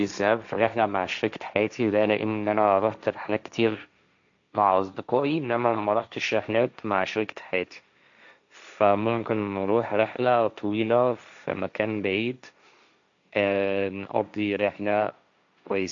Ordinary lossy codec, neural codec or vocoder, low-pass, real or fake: MP3, 96 kbps; codec, 16 kHz, 1.1 kbps, Voila-Tokenizer; 7.2 kHz; fake